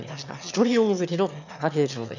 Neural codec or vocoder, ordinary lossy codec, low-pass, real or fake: autoencoder, 22.05 kHz, a latent of 192 numbers a frame, VITS, trained on one speaker; none; 7.2 kHz; fake